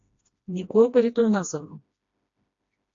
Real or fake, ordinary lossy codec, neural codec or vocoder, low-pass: fake; AAC, 48 kbps; codec, 16 kHz, 1 kbps, FreqCodec, smaller model; 7.2 kHz